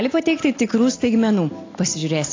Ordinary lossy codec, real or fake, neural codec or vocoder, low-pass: AAC, 32 kbps; real; none; 7.2 kHz